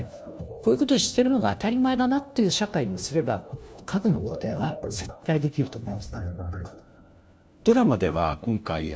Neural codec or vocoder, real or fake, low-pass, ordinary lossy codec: codec, 16 kHz, 1 kbps, FunCodec, trained on LibriTTS, 50 frames a second; fake; none; none